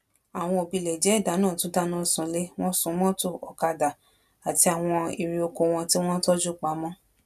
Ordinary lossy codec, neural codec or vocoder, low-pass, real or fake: none; none; 14.4 kHz; real